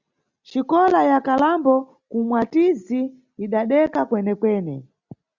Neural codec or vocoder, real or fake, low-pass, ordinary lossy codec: none; real; 7.2 kHz; Opus, 64 kbps